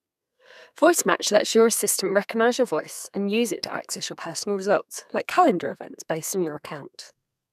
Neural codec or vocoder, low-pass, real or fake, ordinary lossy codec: codec, 32 kHz, 1.9 kbps, SNAC; 14.4 kHz; fake; none